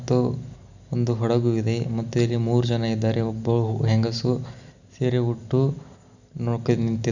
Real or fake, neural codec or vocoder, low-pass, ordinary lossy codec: real; none; 7.2 kHz; none